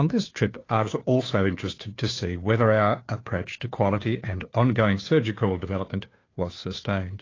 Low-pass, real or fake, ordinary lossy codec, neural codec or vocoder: 7.2 kHz; fake; AAC, 32 kbps; codec, 16 kHz, 2 kbps, FunCodec, trained on Chinese and English, 25 frames a second